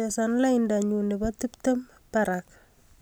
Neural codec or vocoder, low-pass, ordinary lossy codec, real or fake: none; none; none; real